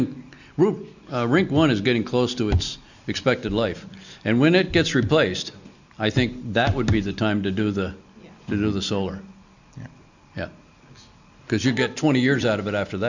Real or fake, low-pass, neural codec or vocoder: real; 7.2 kHz; none